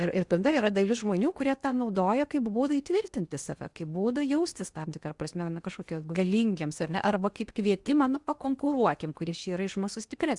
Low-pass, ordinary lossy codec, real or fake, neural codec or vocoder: 10.8 kHz; Opus, 64 kbps; fake; codec, 16 kHz in and 24 kHz out, 0.8 kbps, FocalCodec, streaming, 65536 codes